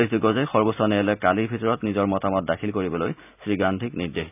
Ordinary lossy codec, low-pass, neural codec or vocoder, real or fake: none; 3.6 kHz; none; real